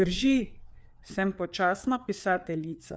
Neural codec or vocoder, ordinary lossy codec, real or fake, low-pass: codec, 16 kHz, 4 kbps, FreqCodec, larger model; none; fake; none